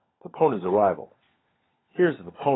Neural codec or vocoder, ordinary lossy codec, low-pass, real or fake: codec, 16 kHz, 16 kbps, FunCodec, trained on LibriTTS, 50 frames a second; AAC, 16 kbps; 7.2 kHz; fake